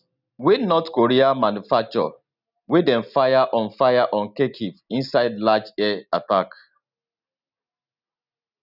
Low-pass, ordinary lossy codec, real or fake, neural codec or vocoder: 5.4 kHz; none; real; none